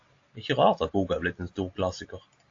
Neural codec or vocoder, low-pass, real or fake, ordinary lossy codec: none; 7.2 kHz; real; AAC, 48 kbps